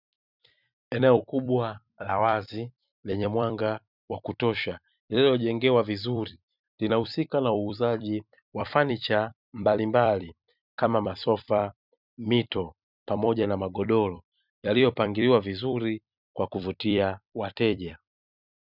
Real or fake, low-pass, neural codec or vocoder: fake; 5.4 kHz; vocoder, 44.1 kHz, 128 mel bands every 256 samples, BigVGAN v2